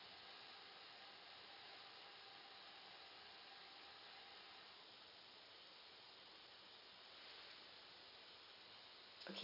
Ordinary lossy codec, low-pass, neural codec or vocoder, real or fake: none; 5.4 kHz; none; real